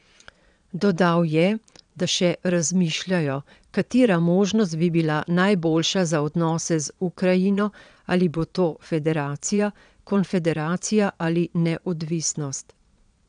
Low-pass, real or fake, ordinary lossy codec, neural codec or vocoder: 9.9 kHz; fake; none; vocoder, 22.05 kHz, 80 mel bands, Vocos